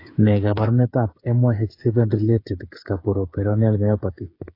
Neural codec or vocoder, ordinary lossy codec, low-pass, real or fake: codec, 16 kHz, 8 kbps, FreqCodec, smaller model; AAC, 32 kbps; 5.4 kHz; fake